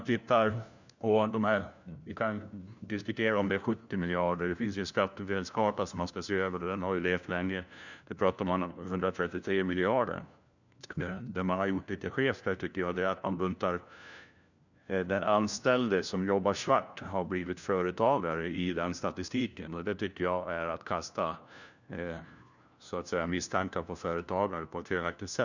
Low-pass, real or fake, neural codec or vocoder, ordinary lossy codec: 7.2 kHz; fake; codec, 16 kHz, 1 kbps, FunCodec, trained on LibriTTS, 50 frames a second; none